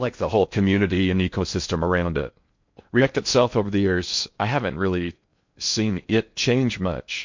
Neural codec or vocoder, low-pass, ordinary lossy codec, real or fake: codec, 16 kHz in and 24 kHz out, 0.6 kbps, FocalCodec, streaming, 4096 codes; 7.2 kHz; MP3, 48 kbps; fake